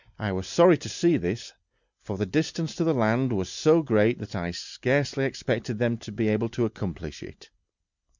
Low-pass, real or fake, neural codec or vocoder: 7.2 kHz; real; none